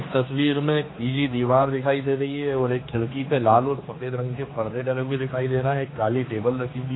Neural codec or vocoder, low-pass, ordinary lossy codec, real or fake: codec, 16 kHz, 2 kbps, X-Codec, HuBERT features, trained on general audio; 7.2 kHz; AAC, 16 kbps; fake